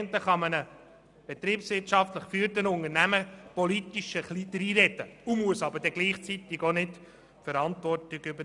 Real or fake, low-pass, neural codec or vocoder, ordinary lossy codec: real; 10.8 kHz; none; none